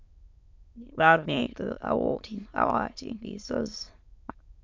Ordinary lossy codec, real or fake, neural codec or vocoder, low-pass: MP3, 64 kbps; fake; autoencoder, 22.05 kHz, a latent of 192 numbers a frame, VITS, trained on many speakers; 7.2 kHz